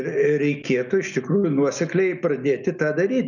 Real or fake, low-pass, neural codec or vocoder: real; 7.2 kHz; none